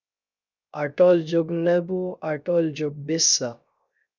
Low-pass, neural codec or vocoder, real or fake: 7.2 kHz; codec, 16 kHz, 0.3 kbps, FocalCodec; fake